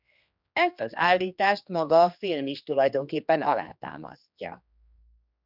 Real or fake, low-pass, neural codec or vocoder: fake; 5.4 kHz; codec, 16 kHz, 2 kbps, X-Codec, HuBERT features, trained on general audio